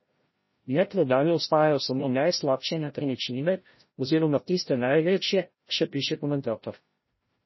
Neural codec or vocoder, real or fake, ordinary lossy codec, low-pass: codec, 16 kHz, 0.5 kbps, FreqCodec, larger model; fake; MP3, 24 kbps; 7.2 kHz